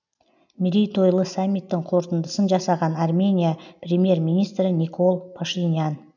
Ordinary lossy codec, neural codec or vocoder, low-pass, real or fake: none; none; 7.2 kHz; real